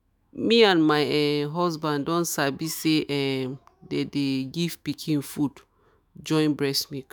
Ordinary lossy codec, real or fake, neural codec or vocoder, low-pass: none; fake; autoencoder, 48 kHz, 128 numbers a frame, DAC-VAE, trained on Japanese speech; none